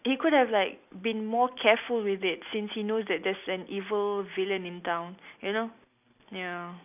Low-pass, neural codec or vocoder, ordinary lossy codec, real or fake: 3.6 kHz; none; none; real